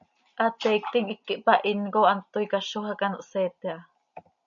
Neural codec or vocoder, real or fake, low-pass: none; real; 7.2 kHz